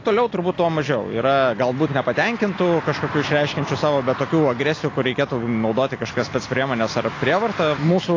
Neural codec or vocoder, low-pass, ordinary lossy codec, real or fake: none; 7.2 kHz; AAC, 32 kbps; real